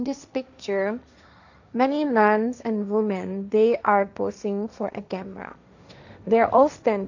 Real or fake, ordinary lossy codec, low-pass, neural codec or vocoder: fake; none; 7.2 kHz; codec, 16 kHz, 1.1 kbps, Voila-Tokenizer